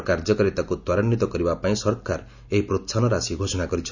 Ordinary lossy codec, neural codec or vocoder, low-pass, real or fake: none; none; 7.2 kHz; real